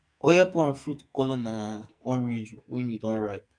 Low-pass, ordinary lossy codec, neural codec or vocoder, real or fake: 9.9 kHz; none; codec, 44.1 kHz, 2.6 kbps, SNAC; fake